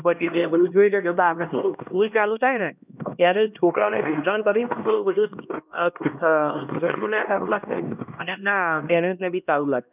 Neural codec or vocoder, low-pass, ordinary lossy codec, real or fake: codec, 16 kHz, 1 kbps, X-Codec, HuBERT features, trained on LibriSpeech; 3.6 kHz; none; fake